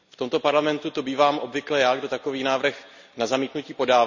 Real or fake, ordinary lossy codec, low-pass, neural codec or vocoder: real; none; 7.2 kHz; none